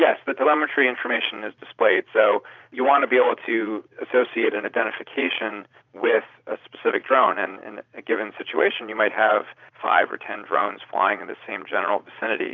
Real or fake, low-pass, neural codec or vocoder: fake; 7.2 kHz; vocoder, 22.05 kHz, 80 mel bands, Vocos